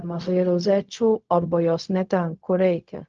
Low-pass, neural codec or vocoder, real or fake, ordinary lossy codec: 7.2 kHz; codec, 16 kHz, 0.4 kbps, LongCat-Audio-Codec; fake; Opus, 24 kbps